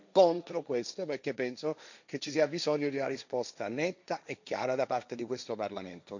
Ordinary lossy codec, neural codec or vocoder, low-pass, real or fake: none; codec, 16 kHz, 1.1 kbps, Voila-Tokenizer; 7.2 kHz; fake